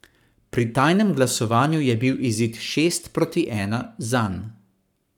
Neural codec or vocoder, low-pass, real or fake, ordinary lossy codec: codec, 44.1 kHz, 7.8 kbps, Pupu-Codec; 19.8 kHz; fake; none